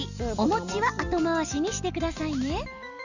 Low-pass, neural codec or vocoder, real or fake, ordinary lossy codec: 7.2 kHz; none; real; none